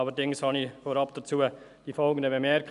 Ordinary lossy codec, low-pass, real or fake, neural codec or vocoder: none; 10.8 kHz; real; none